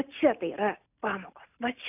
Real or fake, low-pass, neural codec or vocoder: real; 3.6 kHz; none